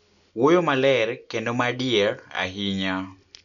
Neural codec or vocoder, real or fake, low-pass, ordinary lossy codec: none; real; 7.2 kHz; none